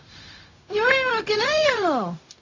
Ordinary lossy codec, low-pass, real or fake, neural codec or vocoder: AAC, 32 kbps; 7.2 kHz; fake; codec, 16 kHz, 0.4 kbps, LongCat-Audio-Codec